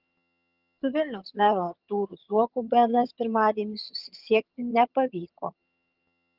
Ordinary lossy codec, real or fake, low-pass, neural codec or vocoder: Opus, 24 kbps; fake; 5.4 kHz; vocoder, 22.05 kHz, 80 mel bands, HiFi-GAN